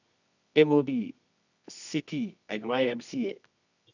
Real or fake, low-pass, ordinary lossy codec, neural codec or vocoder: fake; 7.2 kHz; none; codec, 24 kHz, 0.9 kbps, WavTokenizer, medium music audio release